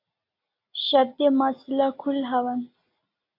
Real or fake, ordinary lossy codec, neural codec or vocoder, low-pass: real; AAC, 32 kbps; none; 5.4 kHz